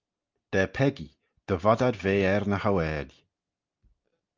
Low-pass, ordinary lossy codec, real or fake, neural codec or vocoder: 7.2 kHz; Opus, 24 kbps; real; none